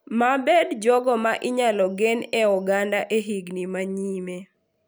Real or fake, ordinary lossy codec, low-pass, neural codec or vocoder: real; none; none; none